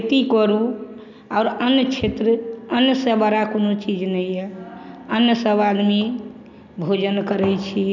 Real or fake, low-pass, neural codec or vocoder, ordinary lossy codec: real; 7.2 kHz; none; none